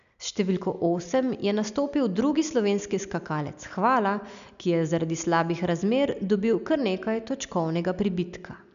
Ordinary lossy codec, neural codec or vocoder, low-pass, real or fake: none; none; 7.2 kHz; real